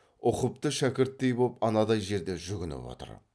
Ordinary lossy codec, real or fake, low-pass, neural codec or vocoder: none; real; none; none